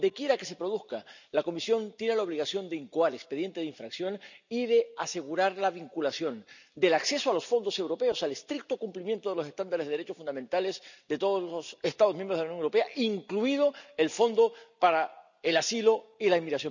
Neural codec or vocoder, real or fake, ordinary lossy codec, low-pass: none; real; none; 7.2 kHz